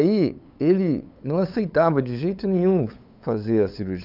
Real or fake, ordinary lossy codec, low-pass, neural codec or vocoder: fake; none; 5.4 kHz; codec, 16 kHz, 8 kbps, FunCodec, trained on LibriTTS, 25 frames a second